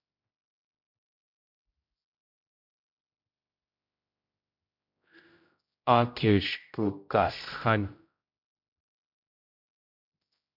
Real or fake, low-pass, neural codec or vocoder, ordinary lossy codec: fake; 5.4 kHz; codec, 16 kHz, 0.5 kbps, X-Codec, HuBERT features, trained on general audio; MP3, 48 kbps